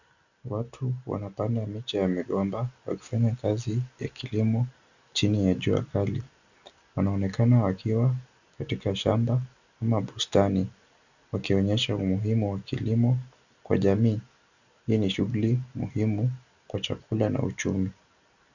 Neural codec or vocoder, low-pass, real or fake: none; 7.2 kHz; real